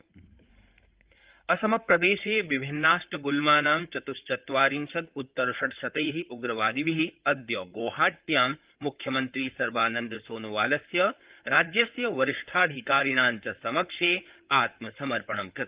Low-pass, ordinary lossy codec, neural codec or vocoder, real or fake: 3.6 kHz; Opus, 32 kbps; codec, 16 kHz in and 24 kHz out, 2.2 kbps, FireRedTTS-2 codec; fake